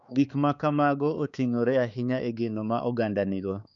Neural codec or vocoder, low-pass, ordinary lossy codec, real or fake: codec, 16 kHz, 4 kbps, X-Codec, HuBERT features, trained on balanced general audio; 7.2 kHz; none; fake